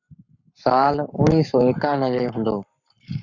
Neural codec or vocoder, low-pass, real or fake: codec, 44.1 kHz, 7.8 kbps, Pupu-Codec; 7.2 kHz; fake